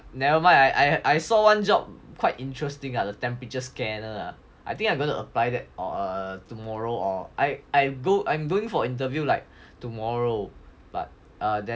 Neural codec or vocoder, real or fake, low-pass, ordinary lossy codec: none; real; none; none